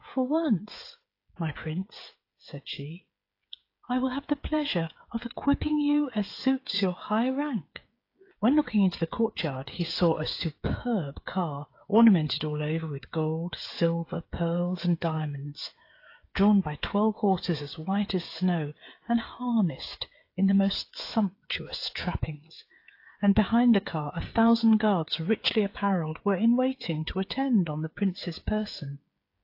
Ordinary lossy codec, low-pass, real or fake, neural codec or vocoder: AAC, 32 kbps; 5.4 kHz; fake; codec, 16 kHz, 8 kbps, FreqCodec, smaller model